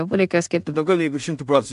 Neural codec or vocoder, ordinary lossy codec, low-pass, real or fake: codec, 16 kHz in and 24 kHz out, 0.4 kbps, LongCat-Audio-Codec, two codebook decoder; MP3, 64 kbps; 10.8 kHz; fake